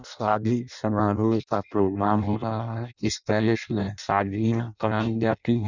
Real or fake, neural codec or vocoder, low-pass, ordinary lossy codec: fake; codec, 16 kHz in and 24 kHz out, 0.6 kbps, FireRedTTS-2 codec; 7.2 kHz; none